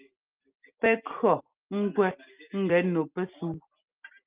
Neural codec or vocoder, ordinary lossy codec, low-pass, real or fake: none; Opus, 24 kbps; 3.6 kHz; real